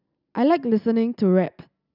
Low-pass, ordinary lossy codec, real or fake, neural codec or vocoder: 5.4 kHz; none; real; none